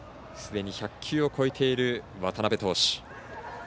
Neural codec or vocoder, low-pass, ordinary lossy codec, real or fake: none; none; none; real